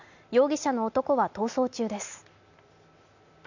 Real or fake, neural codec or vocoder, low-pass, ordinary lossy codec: real; none; 7.2 kHz; none